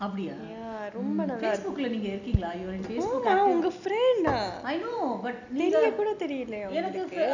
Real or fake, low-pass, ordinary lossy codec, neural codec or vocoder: real; 7.2 kHz; none; none